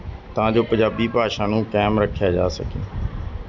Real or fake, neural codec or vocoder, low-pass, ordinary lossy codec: real; none; 7.2 kHz; none